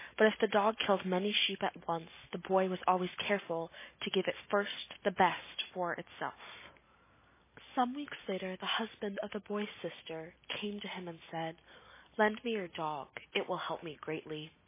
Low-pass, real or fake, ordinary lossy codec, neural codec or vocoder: 3.6 kHz; real; MP3, 16 kbps; none